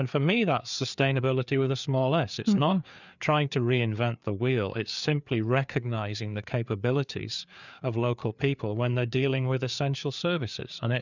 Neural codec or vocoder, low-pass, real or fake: codec, 16 kHz, 4 kbps, FreqCodec, larger model; 7.2 kHz; fake